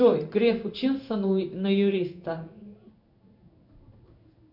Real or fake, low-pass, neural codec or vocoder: fake; 5.4 kHz; codec, 16 kHz in and 24 kHz out, 1 kbps, XY-Tokenizer